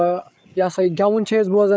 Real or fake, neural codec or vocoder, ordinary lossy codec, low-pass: fake; codec, 16 kHz, 8 kbps, FreqCodec, larger model; none; none